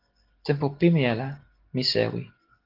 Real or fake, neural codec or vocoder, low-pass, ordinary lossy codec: real; none; 5.4 kHz; Opus, 16 kbps